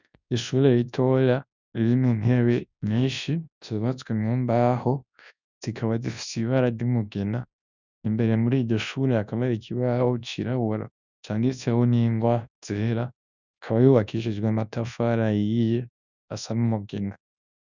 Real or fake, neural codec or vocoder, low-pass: fake; codec, 24 kHz, 0.9 kbps, WavTokenizer, large speech release; 7.2 kHz